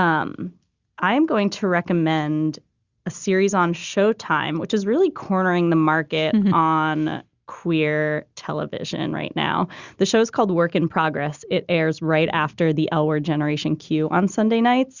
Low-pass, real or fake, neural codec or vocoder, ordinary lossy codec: 7.2 kHz; real; none; Opus, 64 kbps